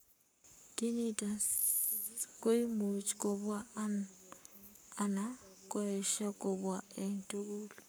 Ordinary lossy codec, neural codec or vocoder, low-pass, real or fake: none; codec, 44.1 kHz, 7.8 kbps, Pupu-Codec; none; fake